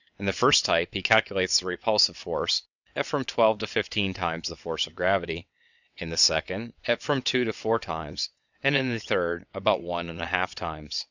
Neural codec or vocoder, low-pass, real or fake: vocoder, 22.05 kHz, 80 mel bands, WaveNeXt; 7.2 kHz; fake